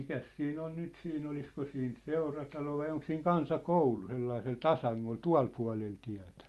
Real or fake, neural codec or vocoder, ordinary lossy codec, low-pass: real; none; Opus, 32 kbps; 14.4 kHz